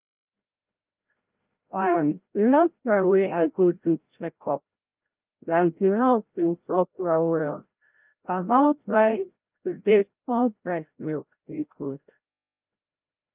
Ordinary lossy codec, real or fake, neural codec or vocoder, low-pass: Opus, 32 kbps; fake; codec, 16 kHz, 0.5 kbps, FreqCodec, larger model; 3.6 kHz